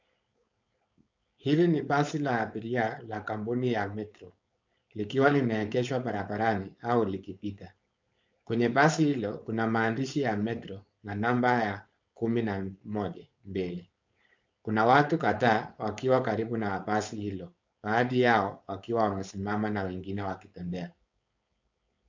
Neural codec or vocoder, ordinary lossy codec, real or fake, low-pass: codec, 16 kHz, 4.8 kbps, FACodec; MP3, 64 kbps; fake; 7.2 kHz